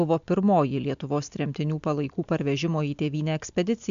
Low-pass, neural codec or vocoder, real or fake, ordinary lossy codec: 7.2 kHz; none; real; MP3, 64 kbps